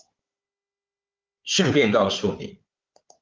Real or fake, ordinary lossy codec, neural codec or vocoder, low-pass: fake; Opus, 32 kbps; codec, 16 kHz, 4 kbps, FunCodec, trained on Chinese and English, 50 frames a second; 7.2 kHz